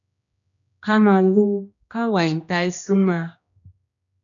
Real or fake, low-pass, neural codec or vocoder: fake; 7.2 kHz; codec, 16 kHz, 1 kbps, X-Codec, HuBERT features, trained on general audio